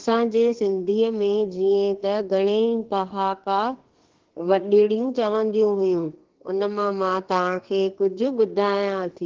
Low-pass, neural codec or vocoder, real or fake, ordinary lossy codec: 7.2 kHz; codec, 32 kHz, 1.9 kbps, SNAC; fake; Opus, 16 kbps